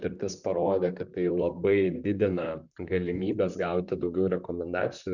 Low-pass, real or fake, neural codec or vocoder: 7.2 kHz; fake; vocoder, 44.1 kHz, 128 mel bands, Pupu-Vocoder